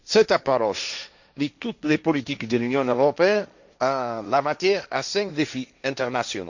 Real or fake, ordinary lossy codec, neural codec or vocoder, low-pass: fake; none; codec, 16 kHz, 1.1 kbps, Voila-Tokenizer; 7.2 kHz